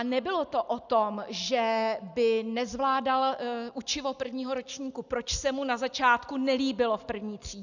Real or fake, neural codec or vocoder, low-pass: real; none; 7.2 kHz